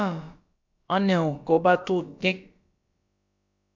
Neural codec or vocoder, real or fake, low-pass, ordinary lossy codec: codec, 16 kHz, about 1 kbps, DyCAST, with the encoder's durations; fake; 7.2 kHz; MP3, 64 kbps